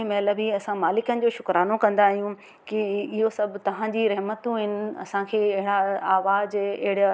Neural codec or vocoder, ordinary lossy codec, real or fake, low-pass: none; none; real; none